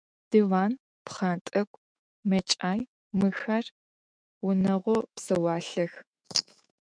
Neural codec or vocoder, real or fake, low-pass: autoencoder, 48 kHz, 128 numbers a frame, DAC-VAE, trained on Japanese speech; fake; 9.9 kHz